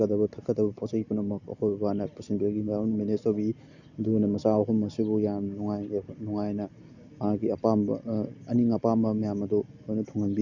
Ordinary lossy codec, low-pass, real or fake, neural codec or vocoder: none; 7.2 kHz; real; none